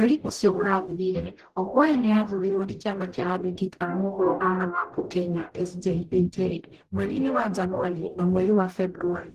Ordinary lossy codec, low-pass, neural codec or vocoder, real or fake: Opus, 16 kbps; 14.4 kHz; codec, 44.1 kHz, 0.9 kbps, DAC; fake